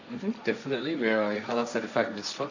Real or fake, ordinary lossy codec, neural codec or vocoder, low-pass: fake; none; codec, 16 kHz, 1.1 kbps, Voila-Tokenizer; 7.2 kHz